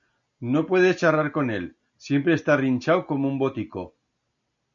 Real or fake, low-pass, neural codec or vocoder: real; 7.2 kHz; none